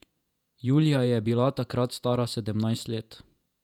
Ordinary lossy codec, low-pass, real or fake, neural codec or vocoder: none; 19.8 kHz; fake; vocoder, 48 kHz, 128 mel bands, Vocos